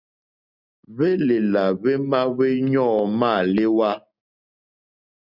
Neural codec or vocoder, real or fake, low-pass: none; real; 5.4 kHz